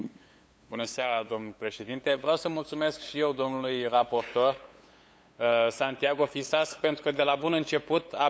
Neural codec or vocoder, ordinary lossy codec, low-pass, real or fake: codec, 16 kHz, 8 kbps, FunCodec, trained on LibriTTS, 25 frames a second; none; none; fake